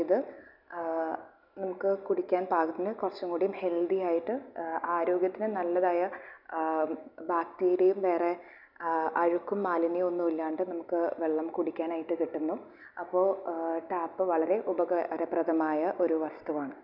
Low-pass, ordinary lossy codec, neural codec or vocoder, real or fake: 5.4 kHz; none; none; real